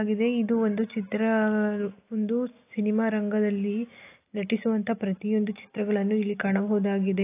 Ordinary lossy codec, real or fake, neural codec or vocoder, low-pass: AAC, 24 kbps; real; none; 3.6 kHz